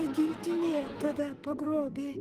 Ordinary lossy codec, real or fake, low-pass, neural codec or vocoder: Opus, 24 kbps; fake; 14.4 kHz; codec, 44.1 kHz, 2.6 kbps, SNAC